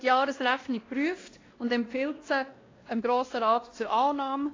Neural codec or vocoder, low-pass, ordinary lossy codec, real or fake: codec, 16 kHz, 1 kbps, X-Codec, WavLM features, trained on Multilingual LibriSpeech; 7.2 kHz; AAC, 32 kbps; fake